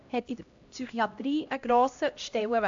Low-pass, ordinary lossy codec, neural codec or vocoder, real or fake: 7.2 kHz; AAC, 64 kbps; codec, 16 kHz, 1 kbps, X-Codec, HuBERT features, trained on LibriSpeech; fake